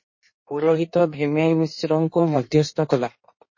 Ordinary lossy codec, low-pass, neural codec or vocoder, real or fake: MP3, 32 kbps; 7.2 kHz; codec, 16 kHz in and 24 kHz out, 1.1 kbps, FireRedTTS-2 codec; fake